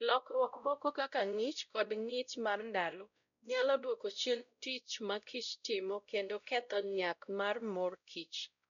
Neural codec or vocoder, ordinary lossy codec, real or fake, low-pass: codec, 16 kHz, 0.5 kbps, X-Codec, WavLM features, trained on Multilingual LibriSpeech; MP3, 96 kbps; fake; 7.2 kHz